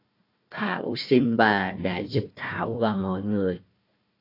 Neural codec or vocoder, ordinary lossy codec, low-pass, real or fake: codec, 16 kHz, 1 kbps, FunCodec, trained on Chinese and English, 50 frames a second; AAC, 32 kbps; 5.4 kHz; fake